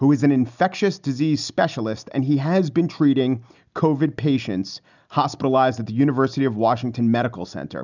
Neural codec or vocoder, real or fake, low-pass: none; real; 7.2 kHz